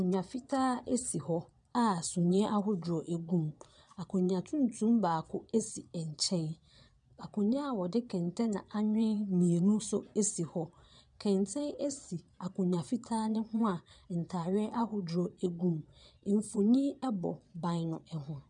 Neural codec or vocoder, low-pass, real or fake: vocoder, 22.05 kHz, 80 mel bands, Vocos; 9.9 kHz; fake